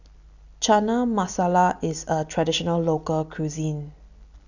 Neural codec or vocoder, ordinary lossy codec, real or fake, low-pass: none; none; real; 7.2 kHz